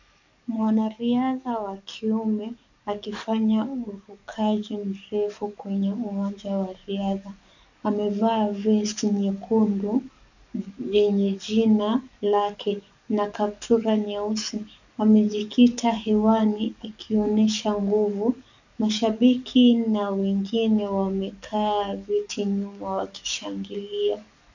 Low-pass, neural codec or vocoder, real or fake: 7.2 kHz; codec, 44.1 kHz, 7.8 kbps, DAC; fake